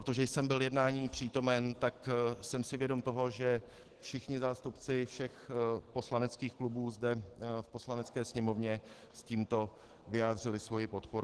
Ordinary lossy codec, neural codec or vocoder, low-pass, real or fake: Opus, 16 kbps; codec, 44.1 kHz, 7.8 kbps, DAC; 10.8 kHz; fake